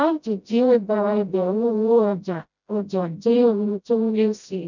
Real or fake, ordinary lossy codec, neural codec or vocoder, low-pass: fake; none; codec, 16 kHz, 0.5 kbps, FreqCodec, smaller model; 7.2 kHz